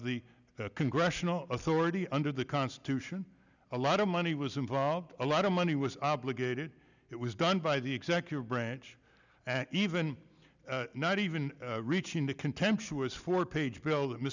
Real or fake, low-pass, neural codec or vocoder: real; 7.2 kHz; none